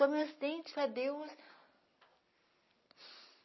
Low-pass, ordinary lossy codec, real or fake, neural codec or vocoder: 7.2 kHz; MP3, 24 kbps; real; none